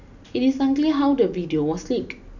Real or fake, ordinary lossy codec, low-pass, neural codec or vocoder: real; none; 7.2 kHz; none